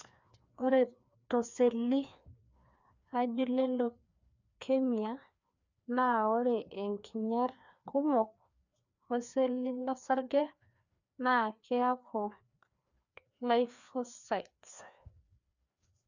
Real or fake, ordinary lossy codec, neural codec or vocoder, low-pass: fake; none; codec, 16 kHz, 2 kbps, FreqCodec, larger model; 7.2 kHz